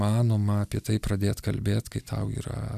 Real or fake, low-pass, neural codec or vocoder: real; 14.4 kHz; none